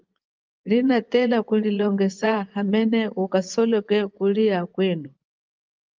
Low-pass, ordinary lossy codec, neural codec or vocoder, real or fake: 7.2 kHz; Opus, 32 kbps; vocoder, 24 kHz, 100 mel bands, Vocos; fake